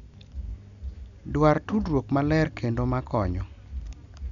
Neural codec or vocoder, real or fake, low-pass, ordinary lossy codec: none; real; 7.2 kHz; none